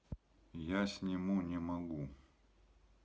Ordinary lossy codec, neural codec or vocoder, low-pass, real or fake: none; none; none; real